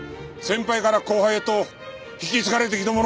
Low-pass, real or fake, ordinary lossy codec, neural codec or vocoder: none; real; none; none